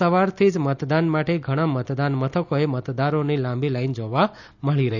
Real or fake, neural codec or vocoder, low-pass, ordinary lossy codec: real; none; 7.2 kHz; none